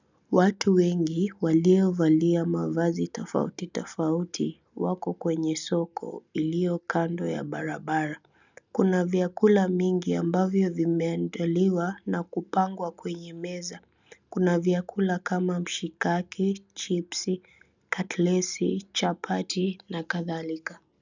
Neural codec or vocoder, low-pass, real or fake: none; 7.2 kHz; real